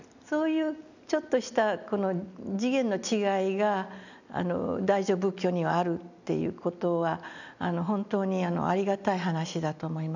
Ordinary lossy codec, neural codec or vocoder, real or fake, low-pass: none; none; real; 7.2 kHz